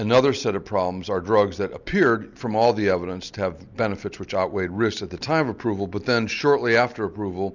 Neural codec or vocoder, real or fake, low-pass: none; real; 7.2 kHz